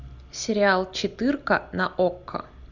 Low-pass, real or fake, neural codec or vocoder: 7.2 kHz; real; none